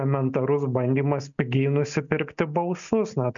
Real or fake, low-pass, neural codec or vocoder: real; 7.2 kHz; none